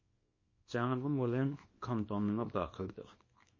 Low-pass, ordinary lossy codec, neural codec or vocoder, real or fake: 7.2 kHz; MP3, 32 kbps; codec, 24 kHz, 0.9 kbps, WavTokenizer, small release; fake